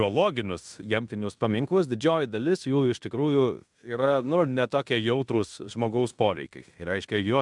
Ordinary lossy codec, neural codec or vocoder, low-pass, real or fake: MP3, 96 kbps; codec, 16 kHz in and 24 kHz out, 0.9 kbps, LongCat-Audio-Codec, fine tuned four codebook decoder; 10.8 kHz; fake